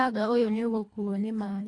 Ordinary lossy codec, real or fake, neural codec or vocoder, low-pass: AAC, 48 kbps; fake; codec, 24 kHz, 1.5 kbps, HILCodec; 10.8 kHz